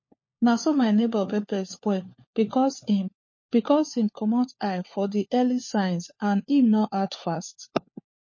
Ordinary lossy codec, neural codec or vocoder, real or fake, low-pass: MP3, 32 kbps; codec, 16 kHz, 4 kbps, FunCodec, trained on LibriTTS, 50 frames a second; fake; 7.2 kHz